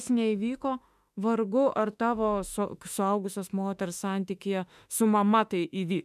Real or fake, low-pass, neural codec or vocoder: fake; 14.4 kHz; autoencoder, 48 kHz, 32 numbers a frame, DAC-VAE, trained on Japanese speech